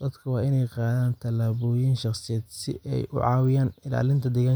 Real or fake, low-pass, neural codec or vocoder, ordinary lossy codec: real; none; none; none